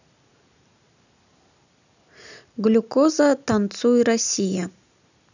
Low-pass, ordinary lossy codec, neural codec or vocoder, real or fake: 7.2 kHz; none; none; real